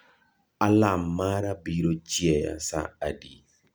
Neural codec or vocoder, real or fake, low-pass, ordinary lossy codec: none; real; none; none